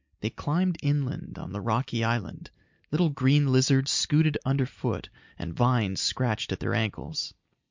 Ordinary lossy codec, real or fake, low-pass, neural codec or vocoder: MP3, 64 kbps; real; 7.2 kHz; none